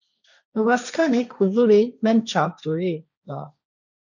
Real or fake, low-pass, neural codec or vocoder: fake; 7.2 kHz; codec, 16 kHz, 1.1 kbps, Voila-Tokenizer